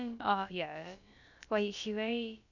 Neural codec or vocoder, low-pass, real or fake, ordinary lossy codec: codec, 16 kHz, about 1 kbps, DyCAST, with the encoder's durations; 7.2 kHz; fake; none